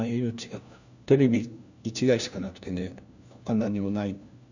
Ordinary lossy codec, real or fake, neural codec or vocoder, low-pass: none; fake; codec, 16 kHz, 1 kbps, FunCodec, trained on LibriTTS, 50 frames a second; 7.2 kHz